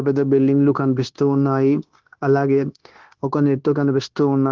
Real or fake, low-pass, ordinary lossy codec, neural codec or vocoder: fake; 7.2 kHz; Opus, 16 kbps; codec, 16 kHz, 0.9 kbps, LongCat-Audio-Codec